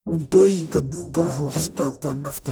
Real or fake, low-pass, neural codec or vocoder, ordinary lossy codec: fake; none; codec, 44.1 kHz, 0.9 kbps, DAC; none